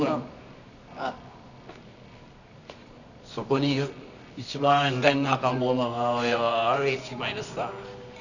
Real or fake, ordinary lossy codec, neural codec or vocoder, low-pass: fake; none; codec, 24 kHz, 0.9 kbps, WavTokenizer, medium music audio release; 7.2 kHz